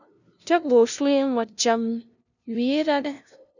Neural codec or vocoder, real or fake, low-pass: codec, 16 kHz, 0.5 kbps, FunCodec, trained on LibriTTS, 25 frames a second; fake; 7.2 kHz